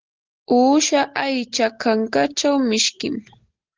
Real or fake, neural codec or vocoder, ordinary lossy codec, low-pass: real; none; Opus, 16 kbps; 7.2 kHz